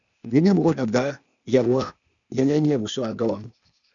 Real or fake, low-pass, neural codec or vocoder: fake; 7.2 kHz; codec, 16 kHz, 0.8 kbps, ZipCodec